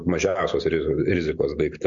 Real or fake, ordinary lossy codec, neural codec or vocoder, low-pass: real; MP3, 48 kbps; none; 7.2 kHz